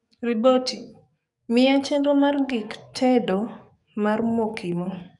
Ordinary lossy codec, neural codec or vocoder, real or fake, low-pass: none; codec, 44.1 kHz, 7.8 kbps, DAC; fake; 10.8 kHz